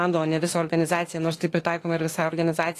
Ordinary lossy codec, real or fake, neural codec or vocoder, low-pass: AAC, 48 kbps; fake; autoencoder, 48 kHz, 32 numbers a frame, DAC-VAE, trained on Japanese speech; 14.4 kHz